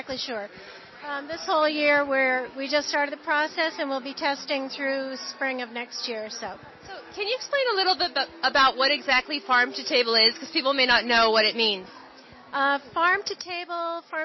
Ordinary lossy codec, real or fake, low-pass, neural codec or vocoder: MP3, 24 kbps; real; 7.2 kHz; none